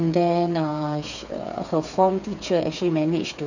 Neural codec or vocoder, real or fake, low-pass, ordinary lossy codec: codec, 16 kHz, 8 kbps, FreqCodec, smaller model; fake; 7.2 kHz; none